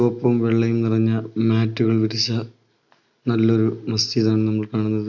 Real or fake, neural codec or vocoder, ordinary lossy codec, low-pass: real; none; none; 7.2 kHz